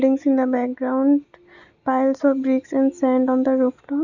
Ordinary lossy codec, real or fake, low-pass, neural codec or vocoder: none; real; 7.2 kHz; none